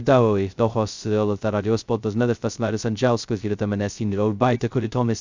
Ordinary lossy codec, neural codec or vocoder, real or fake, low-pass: Opus, 64 kbps; codec, 16 kHz, 0.2 kbps, FocalCodec; fake; 7.2 kHz